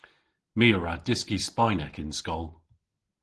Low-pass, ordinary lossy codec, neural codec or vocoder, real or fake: 10.8 kHz; Opus, 16 kbps; none; real